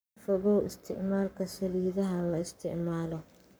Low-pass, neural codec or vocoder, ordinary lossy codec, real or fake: none; codec, 44.1 kHz, 7.8 kbps, Pupu-Codec; none; fake